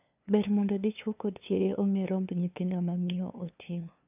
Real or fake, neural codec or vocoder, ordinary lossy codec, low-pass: fake; codec, 16 kHz, 2 kbps, FunCodec, trained on LibriTTS, 25 frames a second; none; 3.6 kHz